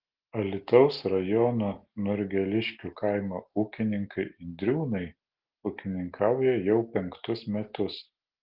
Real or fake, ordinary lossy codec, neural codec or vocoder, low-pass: real; Opus, 16 kbps; none; 5.4 kHz